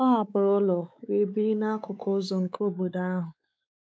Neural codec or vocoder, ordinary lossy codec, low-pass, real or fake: codec, 16 kHz, 4 kbps, X-Codec, WavLM features, trained on Multilingual LibriSpeech; none; none; fake